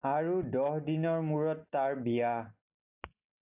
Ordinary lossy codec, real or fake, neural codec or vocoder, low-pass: AAC, 32 kbps; real; none; 3.6 kHz